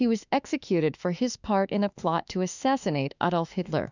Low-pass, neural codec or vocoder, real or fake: 7.2 kHz; autoencoder, 48 kHz, 32 numbers a frame, DAC-VAE, trained on Japanese speech; fake